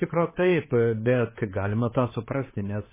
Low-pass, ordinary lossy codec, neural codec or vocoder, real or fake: 3.6 kHz; MP3, 16 kbps; codec, 16 kHz, 4 kbps, X-Codec, HuBERT features, trained on general audio; fake